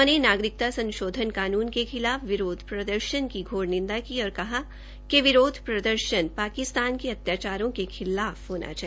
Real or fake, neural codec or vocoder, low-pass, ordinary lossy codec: real; none; none; none